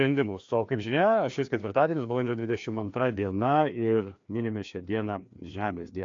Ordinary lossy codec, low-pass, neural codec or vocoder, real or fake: AAC, 48 kbps; 7.2 kHz; codec, 16 kHz, 2 kbps, FreqCodec, larger model; fake